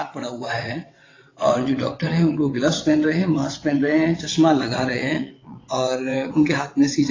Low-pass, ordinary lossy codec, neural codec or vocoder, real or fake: 7.2 kHz; AAC, 32 kbps; vocoder, 22.05 kHz, 80 mel bands, WaveNeXt; fake